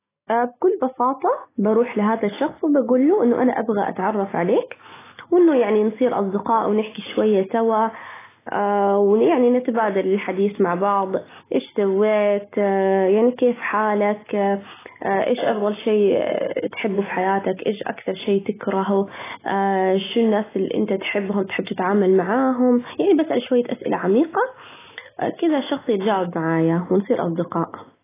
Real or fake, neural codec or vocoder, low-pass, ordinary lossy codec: real; none; 3.6 kHz; AAC, 16 kbps